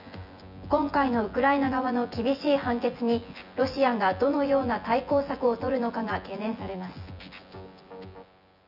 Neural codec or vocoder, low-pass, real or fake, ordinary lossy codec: vocoder, 24 kHz, 100 mel bands, Vocos; 5.4 kHz; fake; none